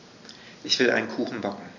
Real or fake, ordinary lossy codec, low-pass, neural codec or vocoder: real; none; 7.2 kHz; none